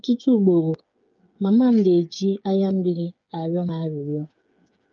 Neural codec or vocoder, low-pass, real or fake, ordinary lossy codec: codec, 16 kHz, 8 kbps, FreqCodec, smaller model; 7.2 kHz; fake; Opus, 24 kbps